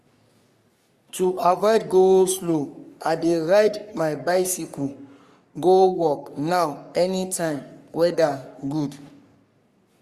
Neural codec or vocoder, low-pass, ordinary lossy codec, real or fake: codec, 44.1 kHz, 3.4 kbps, Pupu-Codec; 14.4 kHz; Opus, 64 kbps; fake